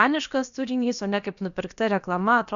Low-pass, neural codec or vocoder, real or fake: 7.2 kHz; codec, 16 kHz, about 1 kbps, DyCAST, with the encoder's durations; fake